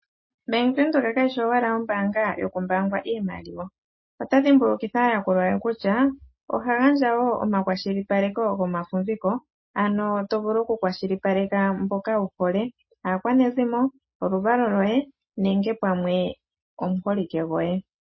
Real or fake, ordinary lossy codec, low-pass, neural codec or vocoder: real; MP3, 24 kbps; 7.2 kHz; none